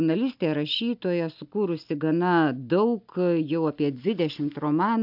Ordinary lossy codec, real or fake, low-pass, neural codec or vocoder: AAC, 48 kbps; real; 5.4 kHz; none